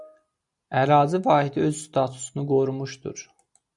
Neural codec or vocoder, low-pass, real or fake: none; 10.8 kHz; real